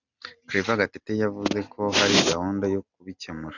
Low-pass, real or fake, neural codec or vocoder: 7.2 kHz; real; none